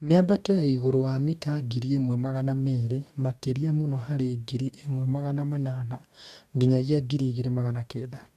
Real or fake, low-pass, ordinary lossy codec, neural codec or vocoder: fake; 14.4 kHz; MP3, 96 kbps; codec, 44.1 kHz, 2.6 kbps, DAC